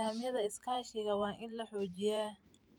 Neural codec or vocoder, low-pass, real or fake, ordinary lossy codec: vocoder, 44.1 kHz, 128 mel bands every 512 samples, BigVGAN v2; 19.8 kHz; fake; none